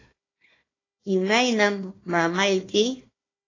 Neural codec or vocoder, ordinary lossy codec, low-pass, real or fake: codec, 16 kHz, 1 kbps, FunCodec, trained on Chinese and English, 50 frames a second; AAC, 32 kbps; 7.2 kHz; fake